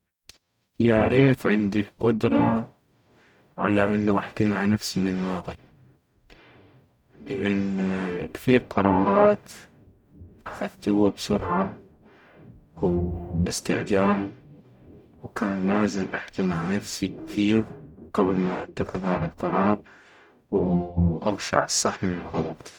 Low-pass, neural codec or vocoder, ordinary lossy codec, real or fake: 19.8 kHz; codec, 44.1 kHz, 0.9 kbps, DAC; none; fake